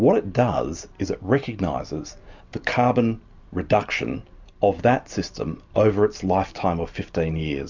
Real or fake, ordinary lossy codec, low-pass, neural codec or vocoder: real; MP3, 64 kbps; 7.2 kHz; none